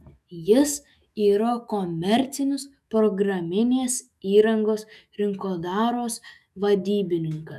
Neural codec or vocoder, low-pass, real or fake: autoencoder, 48 kHz, 128 numbers a frame, DAC-VAE, trained on Japanese speech; 14.4 kHz; fake